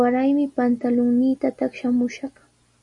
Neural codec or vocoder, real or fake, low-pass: none; real; 10.8 kHz